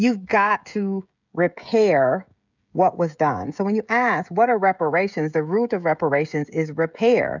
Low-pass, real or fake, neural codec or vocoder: 7.2 kHz; fake; codec, 16 kHz, 16 kbps, FreqCodec, smaller model